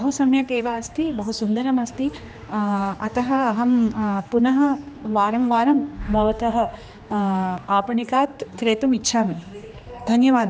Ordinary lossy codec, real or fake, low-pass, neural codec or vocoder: none; fake; none; codec, 16 kHz, 2 kbps, X-Codec, HuBERT features, trained on general audio